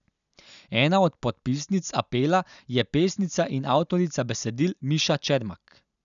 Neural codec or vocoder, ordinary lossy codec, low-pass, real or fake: none; none; 7.2 kHz; real